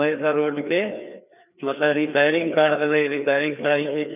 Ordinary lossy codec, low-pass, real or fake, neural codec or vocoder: none; 3.6 kHz; fake; codec, 16 kHz, 2 kbps, FreqCodec, larger model